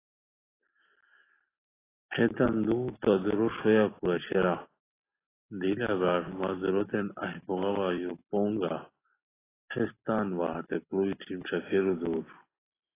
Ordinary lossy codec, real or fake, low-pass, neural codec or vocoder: AAC, 16 kbps; real; 3.6 kHz; none